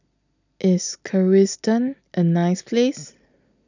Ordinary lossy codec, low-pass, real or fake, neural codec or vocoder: none; 7.2 kHz; real; none